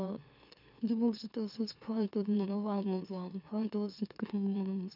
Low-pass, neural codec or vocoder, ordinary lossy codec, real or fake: 5.4 kHz; autoencoder, 44.1 kHz, a latent of 192 numbers a frame, MeloTTS; none; fake